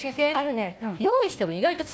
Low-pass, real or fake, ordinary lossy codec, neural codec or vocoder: none; fake; none; codec, 16 kHz, 1 kbps, FunCodec, trained on Chinese and English, 50 frames a second